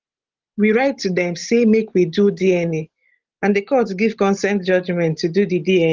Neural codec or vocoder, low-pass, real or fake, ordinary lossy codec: none; 7.2 kHz; real; Opus, 16 kbps